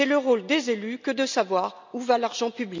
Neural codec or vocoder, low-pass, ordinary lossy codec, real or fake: none; 7.2 kHz; MP3, 64 kbps; real